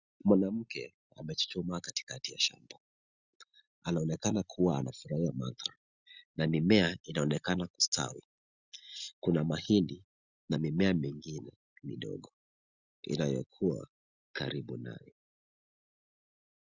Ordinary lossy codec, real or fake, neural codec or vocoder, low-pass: Opus, 64 kbps; real; none; 7.2 kHz